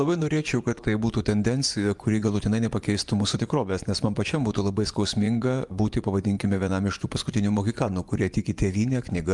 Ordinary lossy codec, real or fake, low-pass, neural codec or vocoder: Opus, 32 kbps; fake; 10.8 kHz; vocoder, 24 kHz, 100 mel bands, Vocos